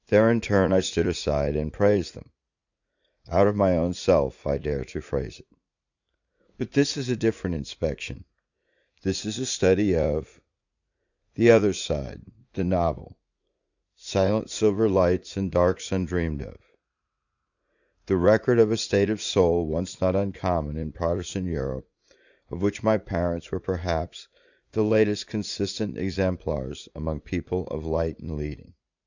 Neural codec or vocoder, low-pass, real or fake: vocoder, 44.1 kHz, 80 mel bands, Vocos; 7.2 kHz; fake